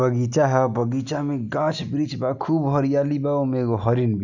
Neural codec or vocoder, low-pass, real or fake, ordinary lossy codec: none; 7.2 kHz; real; none